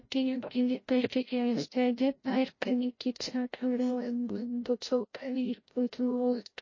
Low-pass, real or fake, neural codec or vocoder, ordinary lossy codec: 7.2 kHz; fake; codec, 16 kHz, 0.5 kbps, FreqCodec, larger model; MP3, 32 kbps